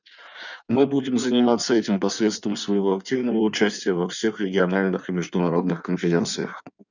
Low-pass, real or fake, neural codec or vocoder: 7.2 kHz; fake; codec, 16 kHz in and 24 kHz out, 1.1 kbps, FireRedTTS-2 codec